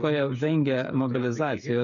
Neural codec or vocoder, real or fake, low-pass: codec, 16 kHz, 8 kbps, FreqCodec, smaller model; fake; 7.2 kHz